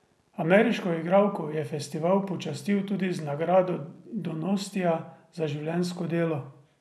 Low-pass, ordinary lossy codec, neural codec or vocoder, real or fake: none; none; none; real